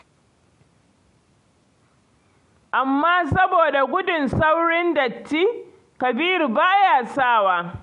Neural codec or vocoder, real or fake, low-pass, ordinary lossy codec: none; real; 10.8 kHz; MP3, 64 kbps